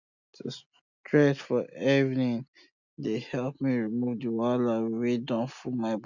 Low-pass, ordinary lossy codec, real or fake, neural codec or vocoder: 7.2 kHz; none; real; none